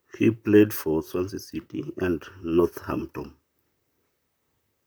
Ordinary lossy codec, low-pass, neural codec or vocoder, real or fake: none; none; vocoder, 44.1 kHz, 128 mel bands, Pupu-Vocoder; fake